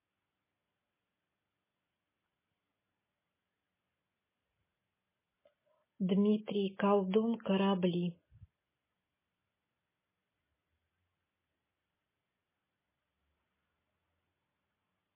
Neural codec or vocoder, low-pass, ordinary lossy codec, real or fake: none; 3.6 kHz; MP3, 16 kbps; real